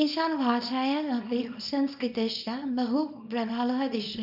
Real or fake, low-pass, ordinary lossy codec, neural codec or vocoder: fake; 5.4 kHz; none; codec, 24 kHz, 0.9 kbps, WavTokenizer, small release